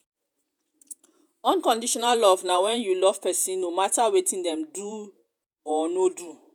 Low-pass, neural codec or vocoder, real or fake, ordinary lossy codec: none; vocoder, 48 kHz, 128 mel bands, Vocos; fake; none